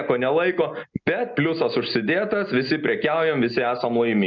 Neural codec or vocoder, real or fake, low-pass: none; real; 7.2 kHz